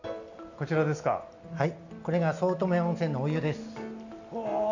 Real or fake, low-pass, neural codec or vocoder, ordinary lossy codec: real; 7.2 kHz; none; none